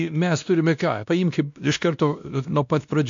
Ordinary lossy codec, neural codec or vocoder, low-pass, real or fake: MP3, 64 kbps; codec, 16 kHz, 1 kbps, X-Codec, WavLM features, trained on Multilingual LibriSpeech; 7.2 kHz; fake